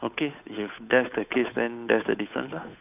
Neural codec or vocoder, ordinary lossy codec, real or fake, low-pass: codec, 16 kHz, 8 kbps, FunCodec, trained on Chinese and English, 25 frames a second; none; fake; 3.6 kHz